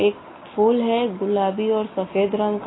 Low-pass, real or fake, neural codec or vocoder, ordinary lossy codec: 7.2 kHz; real; none; AAC, 16 kbps